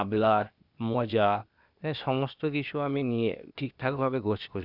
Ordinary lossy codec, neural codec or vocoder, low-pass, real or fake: none; codec, 16 kHz, 0.8 kbps, ZipCodec; 5.4 kHz; fake